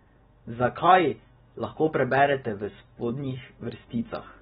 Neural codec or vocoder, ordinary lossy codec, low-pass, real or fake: none; AAC, 16 kbps; 7.2 kHz; real